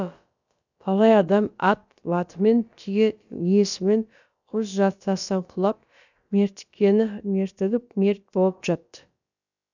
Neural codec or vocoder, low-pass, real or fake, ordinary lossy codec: codec, 16 kHz, about 1 kbps, DyCAST, with the encoder's durations; 7.2 kHz; fake; none